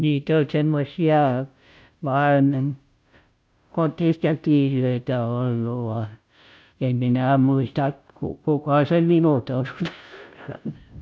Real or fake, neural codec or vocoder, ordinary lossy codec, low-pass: fake; codec, 16 kHz, 0.5 kbps, FunCodec, trained on Chinese and English, 25 frames a second; none; none